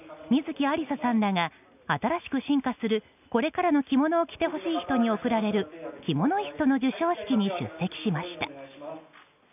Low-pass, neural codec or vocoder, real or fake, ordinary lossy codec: 3.6 kHz; none; real; none